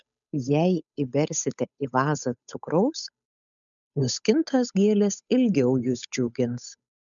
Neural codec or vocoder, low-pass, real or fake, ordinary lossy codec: codec, 16 kHz, 8 kbps, FunCodec, trained on Chinese and English, 25 frames a second; 7.2 kHz; fake; MP3, 96 kbps